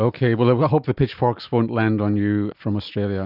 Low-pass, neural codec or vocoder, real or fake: 5.4 kHz; none; real